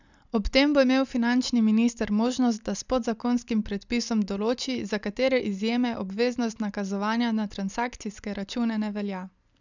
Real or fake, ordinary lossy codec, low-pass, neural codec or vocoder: real; none; 7.2 kHz; none